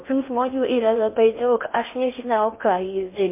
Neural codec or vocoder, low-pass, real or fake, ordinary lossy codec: codec, 16 kHz in and 24 kHz out, 0.8 kbps, FocalCodec, streaming, 65536 codes; 3.6 kHz; fake; MP3, 24 kbps